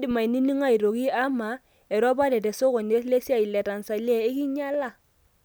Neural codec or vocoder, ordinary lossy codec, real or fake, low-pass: none; none; real; none